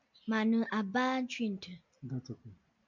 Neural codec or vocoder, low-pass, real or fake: none; 7.2 kHz; real